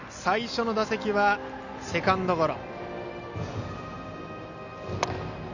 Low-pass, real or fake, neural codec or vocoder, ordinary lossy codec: 7.2 kHz; real; none; none